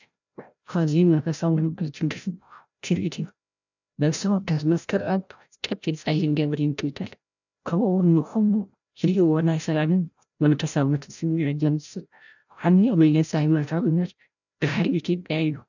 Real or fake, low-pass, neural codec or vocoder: fake; 7.2 kHz; codec, 16 kHz, 0.5 kbps, FreqCodec, larger model